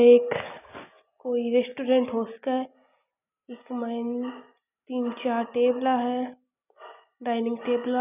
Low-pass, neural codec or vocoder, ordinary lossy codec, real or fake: 3.6 kHz; none; none; real